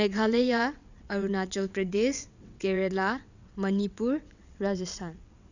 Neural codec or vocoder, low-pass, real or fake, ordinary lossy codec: vocoder, 22.05 kHz, 80 mel bands, WaveNeXt; 7.2 kHz; fake; none